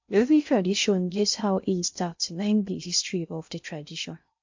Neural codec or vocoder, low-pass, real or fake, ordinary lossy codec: codec, 16 kHz in and 24 kHz out, 0.6 kbps, FocalCodec, streaming, 2048 codes; 7.2 kHz; fake; MP3, 48 kbps